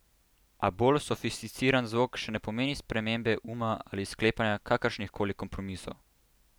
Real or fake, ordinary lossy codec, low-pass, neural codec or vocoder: real; none; none; none